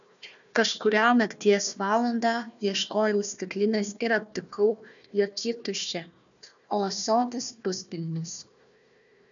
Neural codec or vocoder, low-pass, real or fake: codec, 16 kHz, 1 kbps, FunCodec, trained on Chinese and English, 50 frames a second; 7.2 kHz; fake